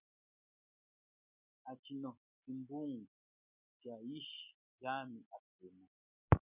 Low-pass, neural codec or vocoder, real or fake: 3.6 kHz; none; real